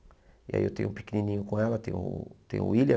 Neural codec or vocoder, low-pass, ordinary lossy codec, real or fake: none; none; none; real